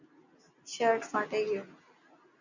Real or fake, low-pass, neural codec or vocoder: real; 7.2 kHz; none